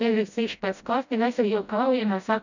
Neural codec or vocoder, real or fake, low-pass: codec, 16 kHz, 0.5 kbps, FreqCodec, smaller model; fake; 7.2 kHz